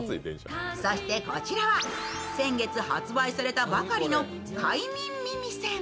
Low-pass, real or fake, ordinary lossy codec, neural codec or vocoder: none; real; none; none